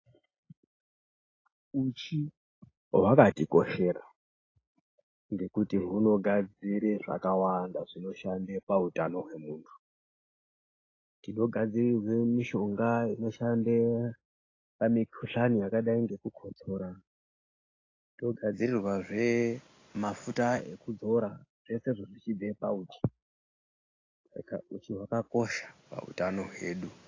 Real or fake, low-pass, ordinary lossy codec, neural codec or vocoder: real; 7.2 kHz; AAC, 32 kbps; none